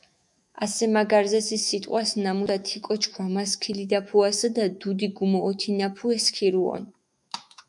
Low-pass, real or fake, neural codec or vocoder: 10.8 kHz; fake; autoencoder, 48 kHz, 128 numbers a frame, DAC-VAE, trained on Japanese speech